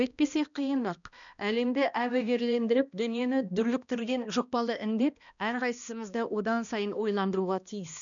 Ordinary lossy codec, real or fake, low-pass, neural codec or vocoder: none; fake; 7.2 kHz; codec, 16 kHz, 1 kbps, X-Codec, HuBERT features, trained on balanced general audio